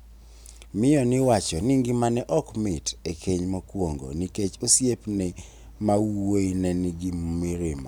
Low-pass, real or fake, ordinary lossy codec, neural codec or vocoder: none; real; none; none